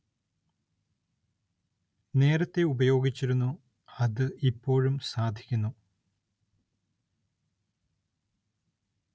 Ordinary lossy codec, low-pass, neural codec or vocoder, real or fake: none; none; none; real